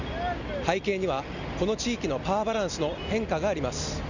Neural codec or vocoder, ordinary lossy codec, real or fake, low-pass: none; none; real; 7.2 kHz